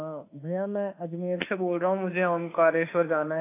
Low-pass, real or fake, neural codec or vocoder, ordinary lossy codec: 3.6 kHz; fake; autoencoder, 48 kHz, 32 numbers a frame, DAC-VAE, trained on Japanese speech; AAC, 24 kbps